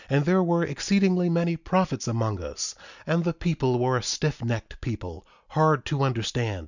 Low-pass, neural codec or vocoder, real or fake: 7.2 kHz; none; real